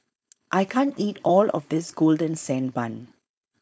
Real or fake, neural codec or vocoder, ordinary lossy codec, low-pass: fake; codec, 16 kHz, 4.8 kbps, FACodec; none; none